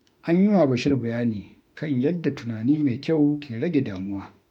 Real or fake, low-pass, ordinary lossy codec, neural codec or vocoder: fake; 19.8 kHz; MP3, 96 kbps; autoencoder, 48 kHz, 32 numbers a frame, DAC-VAE, trained on Japanese speech